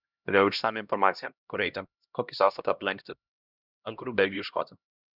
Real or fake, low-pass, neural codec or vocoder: fake; 5.4 kHz; codec, 16 kHz, 1 kbps, X-Codec, HuBERT features, trained on LibriSpeech